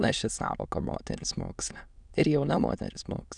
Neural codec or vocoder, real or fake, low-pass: autoencoder, 22.05 kHz, a latent of 192 numbers a frame, VITS, trained on many speakers; fake; 9.9 kHz